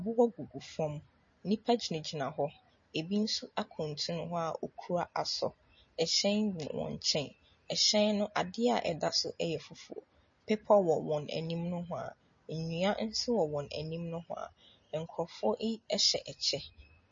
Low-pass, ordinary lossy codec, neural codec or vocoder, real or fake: 7.2 kHz; MP3, 32 kbps; none; real